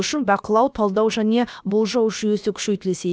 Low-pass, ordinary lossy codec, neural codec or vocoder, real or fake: none; none; codec, 16 kHz, about 1 kbps, DyCAST, with the encoder's durations; fake